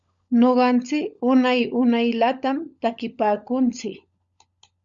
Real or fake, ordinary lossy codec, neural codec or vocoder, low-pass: fake; Opus, 64 kbps; codec, 16 kHz, 16 kbps, FunCodec, trained on LibriTTS, 50 frames a second; 7.2 kHz